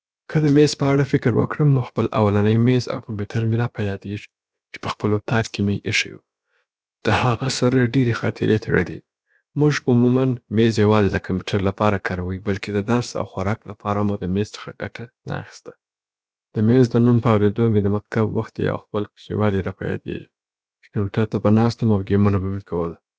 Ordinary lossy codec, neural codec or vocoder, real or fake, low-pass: none; codec, 16 kHz, about 1 kbps, DyCAST, with the encoder's durations; fake; none